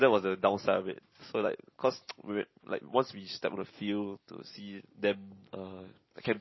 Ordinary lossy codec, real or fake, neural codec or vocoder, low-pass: MP3, 24 kbps; real; none; 7.2 kHz